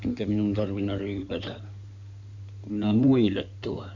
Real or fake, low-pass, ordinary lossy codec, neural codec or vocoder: fake; 7.2 kHz; none; codec, 16 kHz in and 24 kHz out, 2.2 kbps, FireRedTTS-2 codec